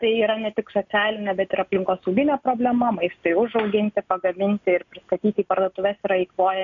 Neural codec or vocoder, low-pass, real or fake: none; 7.2 kHz; real